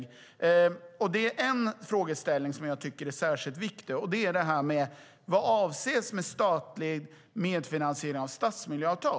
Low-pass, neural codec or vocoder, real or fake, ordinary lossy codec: none; none; real; none